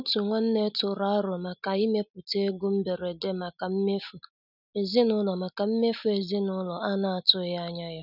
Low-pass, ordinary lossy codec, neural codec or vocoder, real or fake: 5.4 kHz; none; none; real